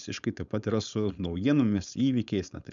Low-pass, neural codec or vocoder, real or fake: 7.2 kHz; codec, 16 kHz, 4.8 kbps, FACodec; fake